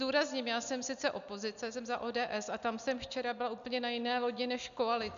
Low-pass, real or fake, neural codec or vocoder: 7.2 kHz; real; none